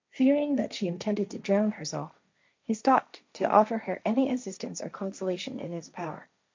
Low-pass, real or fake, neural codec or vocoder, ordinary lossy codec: 7.2 kHz; fake; codec, 16 kHz, 1.1 kbps, Voila-Tokenizer; MP3, 64 kbps